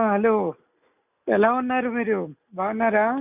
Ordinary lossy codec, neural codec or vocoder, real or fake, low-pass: none; none; real; 3.6 kHz